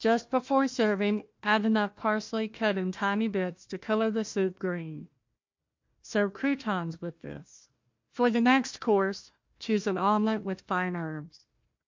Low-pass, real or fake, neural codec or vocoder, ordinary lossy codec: 7.2 kHz; fake; codec, 16 kHz, 1 kbps, FunCodec, trained on Chinese and English, 50 frames a second; MP3, 48 kbps